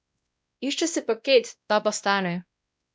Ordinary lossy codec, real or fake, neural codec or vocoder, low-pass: none; fake; codec, 16 kHz, 1 kbps, X-Codec, WavLM features, trained on Multilingual LibriSpeech; none